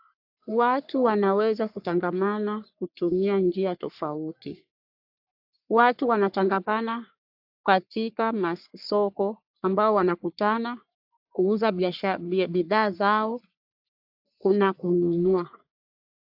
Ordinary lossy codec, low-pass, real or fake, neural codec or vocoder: AAC, 48 kbps; 5.4 kHz; fake; codec, 44.1 kHz, 3.4 kbps, Pupu-Codec